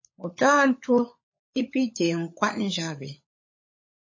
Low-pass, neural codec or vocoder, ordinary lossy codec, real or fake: 7.2 kHz; codec, 16 kHz, 16 kbps, FunCodec, trained on LibriTTS, 50 frames a second; MP3, 32 kbps; fake